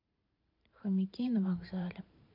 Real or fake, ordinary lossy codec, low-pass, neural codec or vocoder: fake; MP3, 32 kbps; 5.4 kHz; vocoder, 44.1 kHz, 128 mel bands every 512 samples, BigVGAN v2